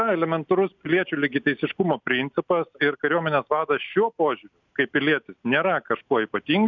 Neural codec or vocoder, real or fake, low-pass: none; real; 7.2 kHz